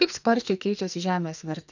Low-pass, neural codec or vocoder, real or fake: 7.2 kHz; codec, 44.1 kHz, 2.6 kbps, SNAC; fake